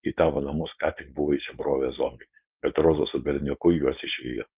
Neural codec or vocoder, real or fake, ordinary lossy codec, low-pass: codec, 16 kHz, 4.8 kbps, FACodec; fake; Opus, 24 kbps; 3.6 kHz